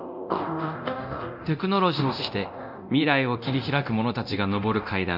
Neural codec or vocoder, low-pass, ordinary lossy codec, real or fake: codec, 24 kHz, 0.9 kbps, DualCodec; 5.4 kHz; none; fake